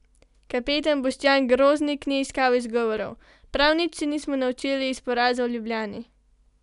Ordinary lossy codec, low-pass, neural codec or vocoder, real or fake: none; 10.8 kHz; none; real